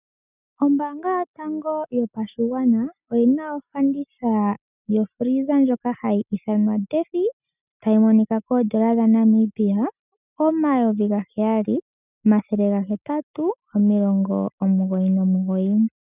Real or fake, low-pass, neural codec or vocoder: real; 3.6 kHz; none